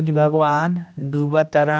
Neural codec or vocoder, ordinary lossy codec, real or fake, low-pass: codec, 16 kHz, 1 kbps, X-Codec, HuBERT features, trained on general audio; none; fake; none